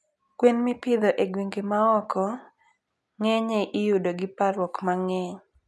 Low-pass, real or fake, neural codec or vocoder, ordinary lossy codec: none; real; none; none